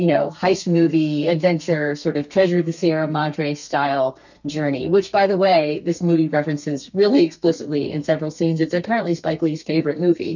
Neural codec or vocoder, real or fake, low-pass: codec, 32 kHz, 1.9 kbps, SNAC; fake; 7.2 kHz